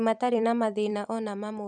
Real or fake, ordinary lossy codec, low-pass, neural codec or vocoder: fake; none; 9.9 kHz; vocoder, 44.1 kHz, 128 mel bands every 256 samples, BigVGAN v2